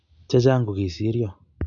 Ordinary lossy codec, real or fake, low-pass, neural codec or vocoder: none; real; 7.2 kHz; none